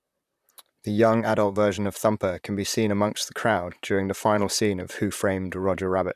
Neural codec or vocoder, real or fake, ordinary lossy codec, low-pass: vocoder, 44.1 kHz, 128 mel bands, Pupu-Vocoder; fake; none; 14.4 kHz